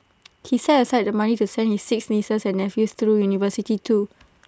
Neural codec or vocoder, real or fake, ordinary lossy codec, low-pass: none; real; none; none